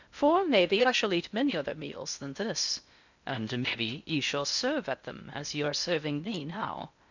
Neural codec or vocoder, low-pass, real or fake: codec, 16 kHz in and 24 kHz out, 0.6 kbps, FocalCodec, streaming, 2048 codes; 7.2 kHz; fake